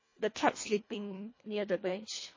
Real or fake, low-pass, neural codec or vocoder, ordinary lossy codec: fake; 7.2 kHz; codec, 24 kHz, 1.5 kbps, HILCodec; MP3, 32 kbps